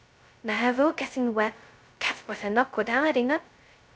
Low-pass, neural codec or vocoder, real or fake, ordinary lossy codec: none; codec, 16 kHz, 0.2 kbps, FocalCodec; fake; none